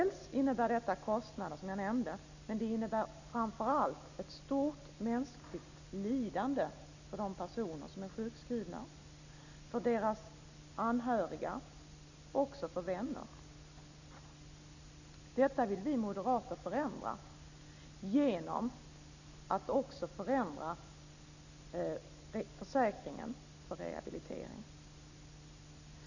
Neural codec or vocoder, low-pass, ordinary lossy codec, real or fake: none; 7.2 kHz; none; real